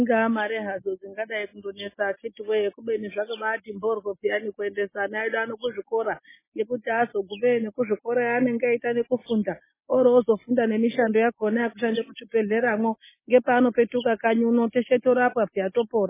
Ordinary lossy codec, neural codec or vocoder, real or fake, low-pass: MP3, 16 kbps; none; real; 3.6 kHz